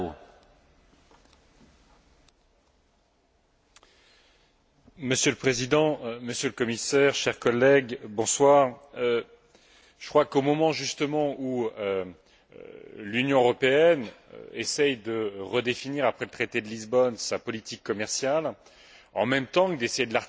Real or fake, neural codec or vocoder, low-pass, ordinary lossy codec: real; none; none; none